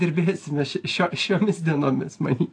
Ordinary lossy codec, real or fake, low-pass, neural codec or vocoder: AAC, 48 kbps; real; 9.9 kHz; none